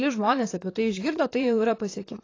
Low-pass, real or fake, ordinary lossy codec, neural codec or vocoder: 7.2 kHz; fake; AAC, 32 kbps; vocoder, 44.1 kHz, 128 mel bands, Pupu-Vocoder